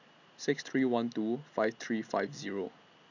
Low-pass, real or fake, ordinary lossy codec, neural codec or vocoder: 7.2 kHz; real; none; none